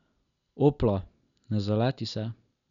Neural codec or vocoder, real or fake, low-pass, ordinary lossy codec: none; real; 7.2 kHz; none